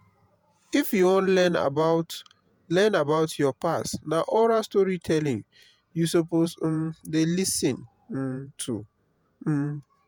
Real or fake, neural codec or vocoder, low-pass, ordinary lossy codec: fake; vocoder, 48 kHz, 128 mel bands, Vocos; none; none